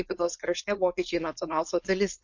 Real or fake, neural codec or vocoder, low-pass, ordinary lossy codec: fake; codec, 16 kHz, 4.8 kbps, FACodec; 7.2 kHz; MP3, 48 kbps